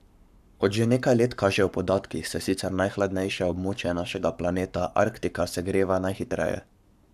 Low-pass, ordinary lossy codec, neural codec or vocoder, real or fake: 14.4 kHz; none; codec, 44.1 kHz, 7.8 kbps, Pupu-Codec; fake